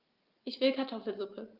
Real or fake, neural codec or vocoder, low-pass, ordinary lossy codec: real; none; 5.4 kHz; Opus, 24 kbps